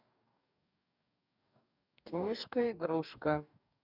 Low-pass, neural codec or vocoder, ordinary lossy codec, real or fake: 5.4 kHz; codec, 44.1 kHz, 2.6 kbps, DAC; none; fake